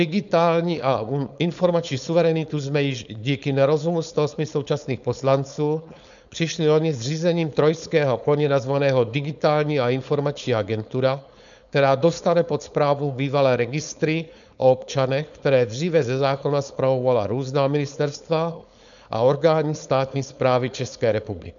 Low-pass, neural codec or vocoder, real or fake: 7.2 kHz; codec, 16 kHz, 4.8 kbps, FACodec; fake